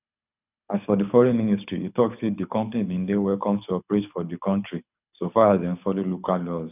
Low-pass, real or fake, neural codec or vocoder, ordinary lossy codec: 3.6 kHz; fake; codec, 24 kHz, 6 kbps, HILCodec; none